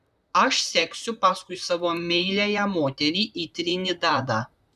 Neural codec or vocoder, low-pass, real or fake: vocoder, 44.1 kHz, 128 mel bands, Pupu-Vocoder; 14.4 kHz; fake